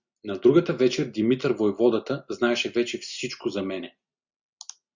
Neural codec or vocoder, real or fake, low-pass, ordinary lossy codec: none; real; 7.2 kHz; Opus, 64 kbps